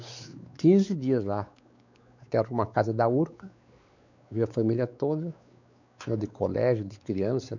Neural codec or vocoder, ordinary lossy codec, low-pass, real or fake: codec, 16 kHz, 4 kbps, X-Codec, WavLM features, trained on Multilingual LibriSpeech; none; 7.2 kHz; fake